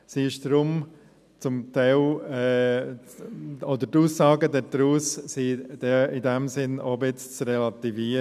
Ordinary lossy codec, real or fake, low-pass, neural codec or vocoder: none; real; 14.4 kHz; none